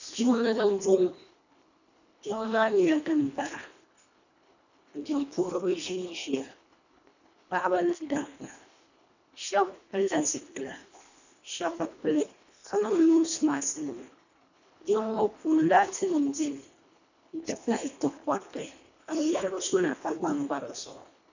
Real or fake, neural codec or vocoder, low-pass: fake; codec, 24 kHz, 1.5 kbps, HILCodec; 7.2 kHz